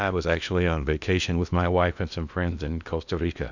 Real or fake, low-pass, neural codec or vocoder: fake; 7.2 kHz; codec, 16 kHz in and 24 kHz out, 0.8 kbps, FocalCodec, streaming, 65536 codes